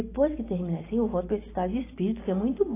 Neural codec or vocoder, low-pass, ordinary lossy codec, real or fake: none; 3.6 kHz; AAC, 16 kbps; real